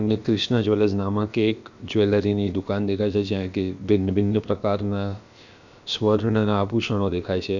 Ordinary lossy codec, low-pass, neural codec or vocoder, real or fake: none; 7.2 kHz; codec, 16 kHz, about 1 kbps, DyCAST, with the encoder's durations; fake